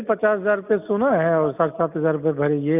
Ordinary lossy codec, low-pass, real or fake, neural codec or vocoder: none; 3.6 kHz; real; none